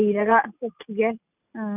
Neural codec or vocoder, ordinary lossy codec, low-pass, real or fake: none; none; 3.6 kHz; real